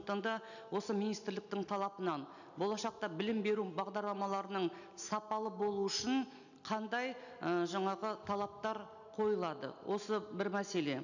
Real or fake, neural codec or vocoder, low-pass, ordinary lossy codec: real; none; 7.2 kHz; none